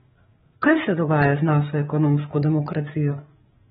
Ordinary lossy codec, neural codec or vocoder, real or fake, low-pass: AAC, 16 kbps; codec, 16 kHz, 8 kbps, FreqCodec, larger model; fake; 7.2 kHz